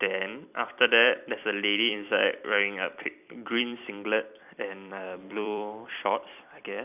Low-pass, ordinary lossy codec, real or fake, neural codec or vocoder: 3.6 kHz; none; fake; vocoder, 44.1 kHz, 128 mel bands every 256 samples, BigVGAN v2